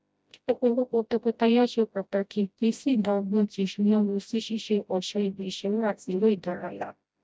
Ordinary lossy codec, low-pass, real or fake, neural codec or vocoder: none; none; fake; codec, 16 kHz, 0.5 kbps, FreqCodec, smaller model